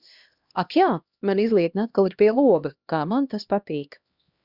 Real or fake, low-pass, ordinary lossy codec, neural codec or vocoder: fake; 5.4 kHz; Opus, 64 kbps; codec, 16 kHz, 1 kbps, X-Codec, HuBERT features, trained on LibriSpeech